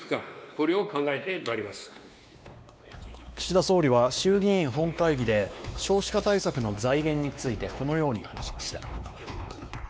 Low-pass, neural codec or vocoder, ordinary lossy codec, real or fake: none; codec, 16 kHz, 2 kbps, X-Codec, WavLM features, trained on Multilingual LibriSpeech; none; fake